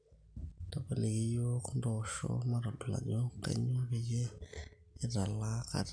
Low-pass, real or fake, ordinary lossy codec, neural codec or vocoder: 9.9 kHz; real; AAC, 64 kbps; none